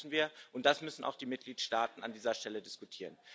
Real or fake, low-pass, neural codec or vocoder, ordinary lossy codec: real; none; none; none